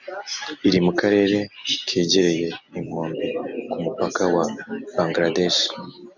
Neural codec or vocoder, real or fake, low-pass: none; real; 7.2 kHz